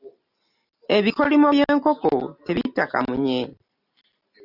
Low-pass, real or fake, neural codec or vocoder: 5.4 kHz; real; none